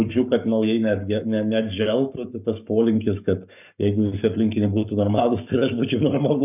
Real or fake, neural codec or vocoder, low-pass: fake; codec, 44.1 kHz, 7.8 kbps, Pupu-Codec; 3.6 kHz